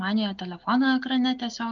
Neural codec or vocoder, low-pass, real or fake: none; 7.2 kHz; real